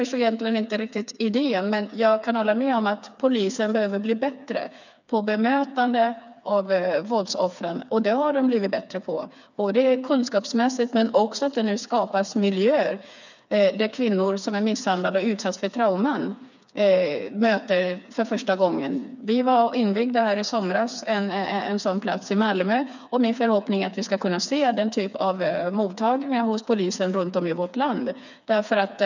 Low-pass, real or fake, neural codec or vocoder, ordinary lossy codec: 7.2 kHz; fake; codec, 16 kHz, 4 kbps, FreqCodec, smaller model; none